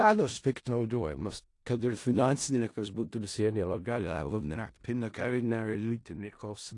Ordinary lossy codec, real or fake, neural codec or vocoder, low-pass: AAC, 48 kbps; fake; codec, 16 kHz in and 24 kHz out, 0.4 kbps, LongCat-Audio-Codec, four codebook decoder; 10.8 kHz